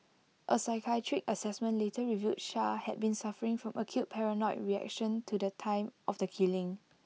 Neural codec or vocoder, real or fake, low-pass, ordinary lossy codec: none; real; none; none